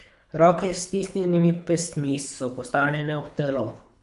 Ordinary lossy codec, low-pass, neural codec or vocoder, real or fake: none; 10.8 kHz; codec, 24 kHz, 3 kbps, HILCodec; fake